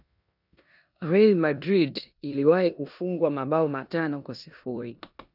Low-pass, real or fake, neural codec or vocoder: 5.4 kHz; fake; codec, 16 kHz in and 24 kHz out, 0.9 kbps, LongCat-Audio-Codec, four codebook decoder